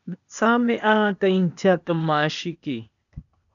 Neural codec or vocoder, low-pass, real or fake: codec, 16 kHz, 0.8 kbps, ZipCodec; 7.2 kHz; fake